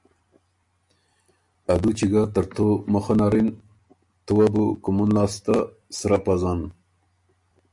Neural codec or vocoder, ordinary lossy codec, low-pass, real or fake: none; MP3, 64 kbps; 10.8 kHz; real